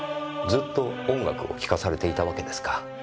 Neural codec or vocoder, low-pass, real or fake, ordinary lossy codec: none; none; real; none